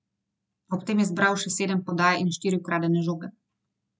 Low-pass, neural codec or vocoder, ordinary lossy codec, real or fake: none; none; none; real